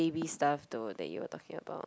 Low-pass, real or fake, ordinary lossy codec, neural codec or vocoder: none; real; none; none